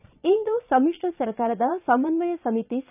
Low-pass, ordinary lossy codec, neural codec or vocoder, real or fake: 3.6 kHz; none; vocoder, 22.05 kHz, 80 mel bands, Vocos; fake